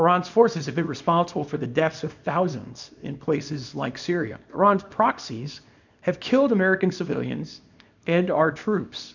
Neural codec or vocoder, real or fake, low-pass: codec, 24 kHz, 0.9 kbps, WavTokenizer, small release; fake; 7.2 kHz